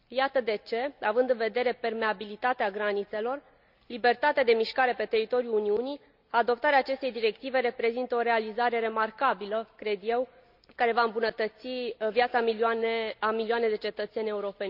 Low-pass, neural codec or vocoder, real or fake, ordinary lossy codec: 5.4 kHz; none; real; none